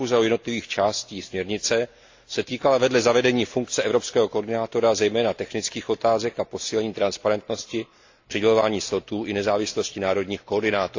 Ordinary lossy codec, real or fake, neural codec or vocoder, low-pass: AAC, 48 kbps; real; none; 7.2 kHz